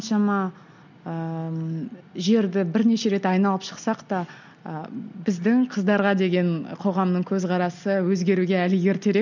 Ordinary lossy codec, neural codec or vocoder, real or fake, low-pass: none; none; real; 7.2 kHz